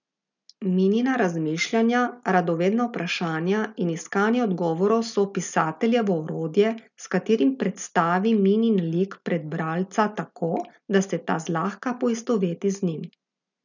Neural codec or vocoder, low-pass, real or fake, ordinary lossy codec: none; 7.2 kHz; real; none